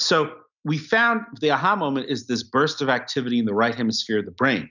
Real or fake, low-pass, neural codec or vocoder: real; 7.2 kHz; none